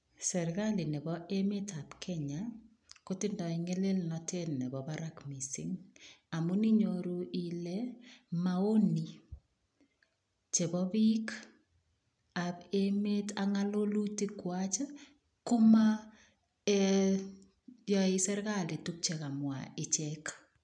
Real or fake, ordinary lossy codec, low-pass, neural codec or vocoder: real; none; 9.9 kHz; none